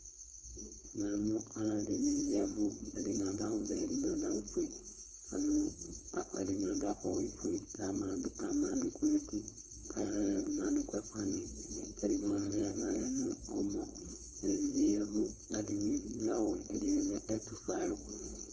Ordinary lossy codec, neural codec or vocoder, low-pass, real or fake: Opus, 24 kbps; codec, 16 kHz, 4.8 kbps, FACodec; 7.2 kHz; fake